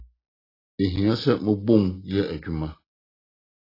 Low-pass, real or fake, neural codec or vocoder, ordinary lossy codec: 5.4 kHz; real; none; AAC, 24 kbps